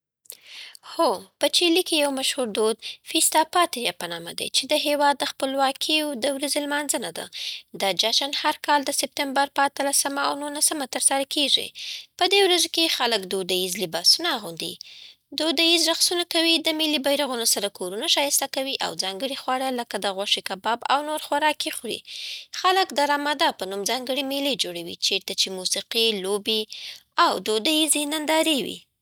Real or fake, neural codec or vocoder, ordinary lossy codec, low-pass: real; none; none; none